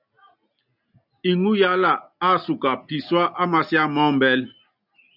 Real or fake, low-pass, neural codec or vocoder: real; 5.4 kHz; none